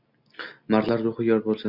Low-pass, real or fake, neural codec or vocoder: 5.4 kHz; real; none